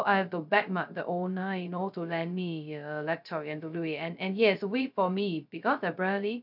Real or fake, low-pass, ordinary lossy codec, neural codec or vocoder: fake; 5.4 kHz; none; codec, 16 kHz, 0.2 kbps, FocalCodec